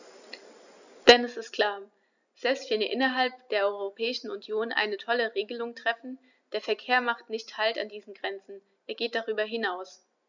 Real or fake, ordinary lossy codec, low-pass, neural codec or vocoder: real; none; 7.2 kHz; none